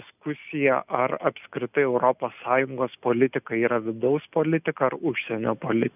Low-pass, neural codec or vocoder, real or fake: 3.6 kHz; none; real